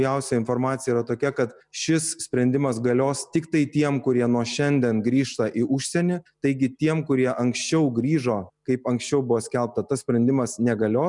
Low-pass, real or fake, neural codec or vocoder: 10.8 kHz; real; none